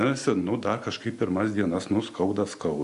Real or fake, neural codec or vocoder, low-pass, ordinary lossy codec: real; none; 10.8 kHz; AAC, 64 kbps